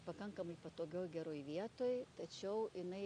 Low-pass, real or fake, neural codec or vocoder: 9.9 kHz; real; none